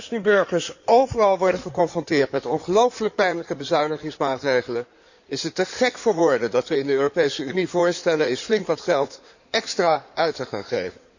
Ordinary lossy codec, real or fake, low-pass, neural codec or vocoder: none; fake; 7.2 kHz; codec, 16 kHz in and 24 kHz out, 2.2 kbps, FireRedTTS-2 codec